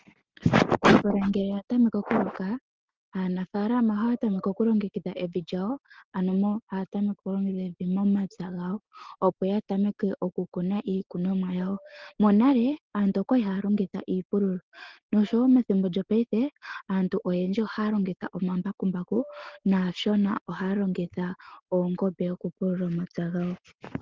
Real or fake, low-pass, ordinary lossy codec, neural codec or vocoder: real; 7.2 kHz; Opus, 16 kbps; none